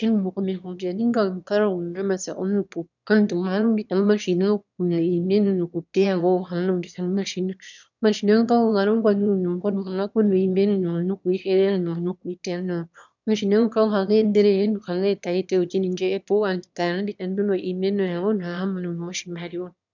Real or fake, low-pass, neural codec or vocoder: fake; 7.2 kHz; autoencoder, 22.05 kHz, a latent of 192 numbers a frame, VITS, trained on one speaker